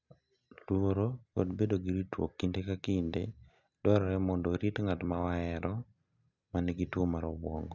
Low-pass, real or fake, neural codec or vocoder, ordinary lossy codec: 7.2 kHz; real; none; none